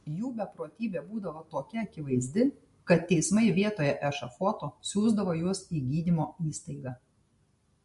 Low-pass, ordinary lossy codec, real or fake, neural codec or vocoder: 14.4 kHz; MP3, 48 kbps; real; none